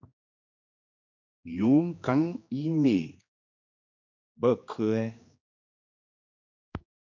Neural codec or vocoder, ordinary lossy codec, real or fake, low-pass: codec, 16 kHz, 2 kbps, X-Codec, HuBERT features, trained on general audio; MP3, 64 kbps; fake; 7.2 kHz